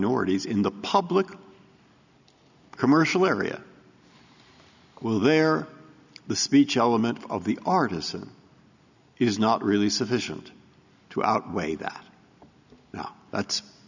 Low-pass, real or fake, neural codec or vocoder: 7.2 kHz; real; none